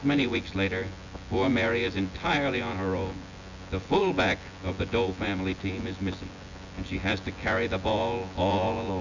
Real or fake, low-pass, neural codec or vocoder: fake; 7.2 kHz; vocoder, 24 kHz, 100 mel bands, Vocos